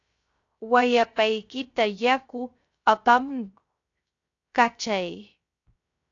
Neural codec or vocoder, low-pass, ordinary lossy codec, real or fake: codec, 16 kHz, 0.3 kbps, FocalCodec; 7.2 kHz; AAC, 48 kbps; fake